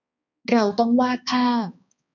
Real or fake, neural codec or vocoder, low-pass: fake; codec, 16 kHz, 2 kbps, X-Codec, HuBERT features, trained on balanced general audio; 7.2 kHz